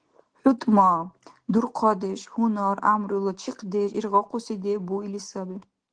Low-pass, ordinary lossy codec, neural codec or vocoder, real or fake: 9.9 kHz; Opus, 16 kbps; none; real